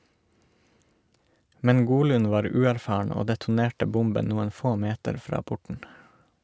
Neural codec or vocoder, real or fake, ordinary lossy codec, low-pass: none; real; none; none